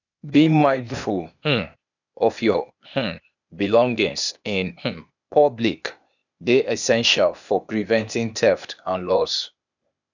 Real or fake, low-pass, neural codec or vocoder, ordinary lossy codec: fake; 7.2 kHz; codec, 16 kHz, 0.8 kbps, ZipCodec; none